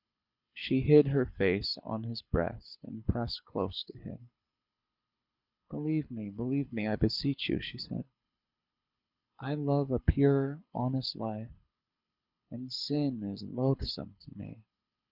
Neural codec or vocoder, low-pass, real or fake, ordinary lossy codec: codec, 24 kHz, 6 kbps, HILCodec; 5.4 kHz; fake; Opus, 64 kbps